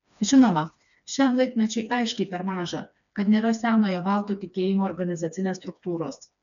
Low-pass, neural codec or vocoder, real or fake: 7.2 kHz; codec, 16 kHz, 2 kbps, FreqCodec, smaller model; fake